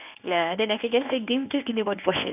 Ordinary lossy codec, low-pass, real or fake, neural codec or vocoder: none; 3.6 kHz; fake; codec, 24 kHz, 0.9 kbps, WavTokenizer, medium speech release version 1